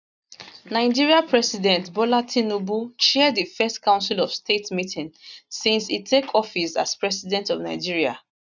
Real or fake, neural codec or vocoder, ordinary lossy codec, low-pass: real; none; none; 7.2 kHz